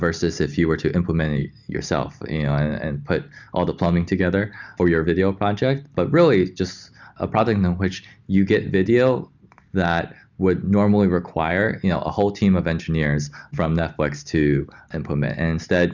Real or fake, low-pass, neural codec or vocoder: real; 7.2 kHz; none